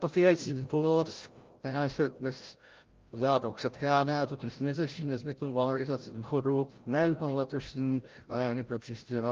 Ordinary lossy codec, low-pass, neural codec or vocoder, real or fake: Opus, 32 kbps; 7.2 kHz; codec, 16 kHz, 0.5 kbps, FreqCodec, larger model; fake